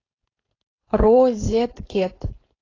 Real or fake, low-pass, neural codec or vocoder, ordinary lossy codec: fake; 7.2 kHz; codec, 16 kHz, 4.8 kbps, FACodec; AAC, 32 kbps